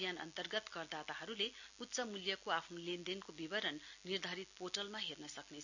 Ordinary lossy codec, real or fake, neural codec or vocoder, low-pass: none; real; none; 7.2 kHz